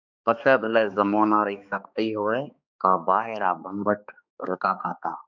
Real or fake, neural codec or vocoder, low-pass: fake; codec, 16 kHz, 2 kbps, X-Codec, HuBERT features, trained on balanced general audio; 7.2 kHz